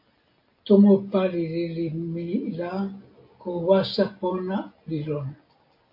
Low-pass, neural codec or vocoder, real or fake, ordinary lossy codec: 5.4 kHz; none; real; MP3, 32 kbps